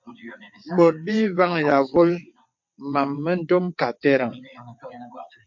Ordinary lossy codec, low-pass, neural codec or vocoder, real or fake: MP3, 48 kbps; 7.2 kHz; vocoder, 22.05 kHz, 80 mel bands, WaveNeXt; fake